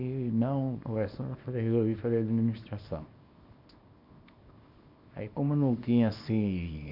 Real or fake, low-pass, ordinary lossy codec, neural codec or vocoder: fake; 5.4 kHz; none; codec, 24 kHz, 0.9 kbps, WavTokenizer, small release